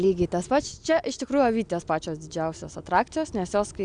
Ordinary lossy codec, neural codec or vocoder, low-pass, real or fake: Opus, 64 kbps; none; 9.9 kHz; real